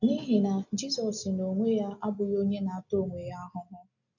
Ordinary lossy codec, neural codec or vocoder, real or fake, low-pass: none; none; real; 7.2 kHz